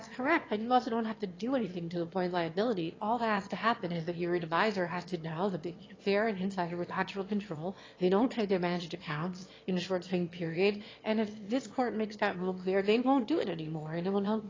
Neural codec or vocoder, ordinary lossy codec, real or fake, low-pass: autoencoder, 22.05 kHz, a latent of 192 numbers a frame, VITS, trained on one speaker; AAC, 32 kbps; fake; 7.2 kHz